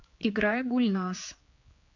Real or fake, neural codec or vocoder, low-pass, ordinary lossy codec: fake; codec, 16 kHz, 4 kbps, X-Codec, HuBERT features, trained on general audio; 7.2 kHz; AAC, 48 kbps